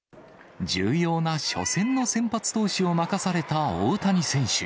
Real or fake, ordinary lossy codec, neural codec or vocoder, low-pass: real; none; none; none